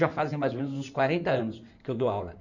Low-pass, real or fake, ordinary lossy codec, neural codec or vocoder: 7.2 kHz; fake; none; codec, 16 kHz in and 24 kHz out, 2.2 kbps, FireRedTTS-2 codec